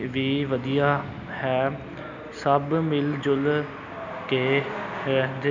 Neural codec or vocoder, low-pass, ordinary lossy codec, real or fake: none; 7.2 kHz; none; real